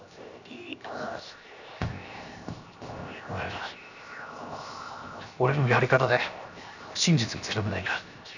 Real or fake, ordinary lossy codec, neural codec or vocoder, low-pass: fake; none; codec, 16 kHz, 0.7 kbps, FocalCodec; 7.2 kHz